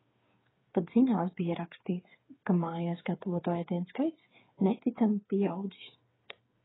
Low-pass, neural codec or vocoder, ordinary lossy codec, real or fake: 7.2 kHz; codec, 16 kHz, 8 kbps, FreqCodec, smaller model; AAC, 16 kbps; fake